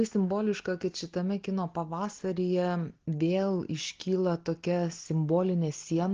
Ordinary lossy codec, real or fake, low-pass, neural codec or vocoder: Opus, 16 kbps; real; 7.2 kHz; none